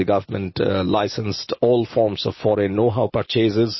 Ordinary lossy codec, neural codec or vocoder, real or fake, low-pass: MP3, 24 kbps; none; real; 7.2 kHz